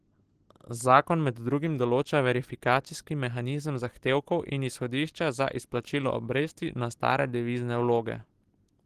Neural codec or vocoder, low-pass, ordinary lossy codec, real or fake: none; 14.4 kHz; Opus, 16 kbps; real